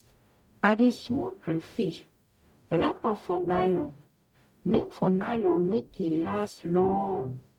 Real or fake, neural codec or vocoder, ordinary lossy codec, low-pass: fake; codec, 44.1 kHz, 0.9 kbps, DAC; MP3, 96 kbps; 19.8 kHz